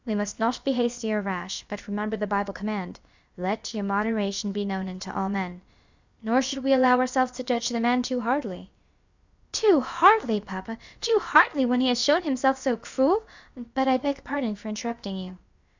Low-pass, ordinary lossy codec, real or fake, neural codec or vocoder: 7.2 kHz; Opus, 64 kbps; fake; codec, 16 kHz, about 1 kbps, DyCAST, with the encoder's durations